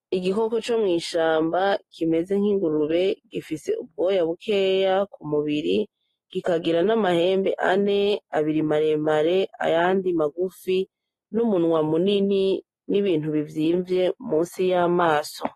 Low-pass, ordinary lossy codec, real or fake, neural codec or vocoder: 19.8 kHz; AAC, 32 kbps; real; none